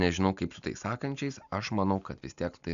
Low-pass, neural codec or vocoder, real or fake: 7.2 kHz; none; real